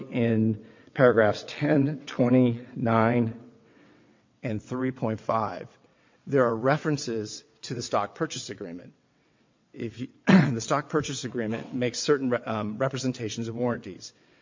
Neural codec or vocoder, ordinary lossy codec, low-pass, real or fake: vocoder, 22.05 kHz, 80 mel bands, WaveNeXt; MP3, 48 kbps; 7.2 kHz; fake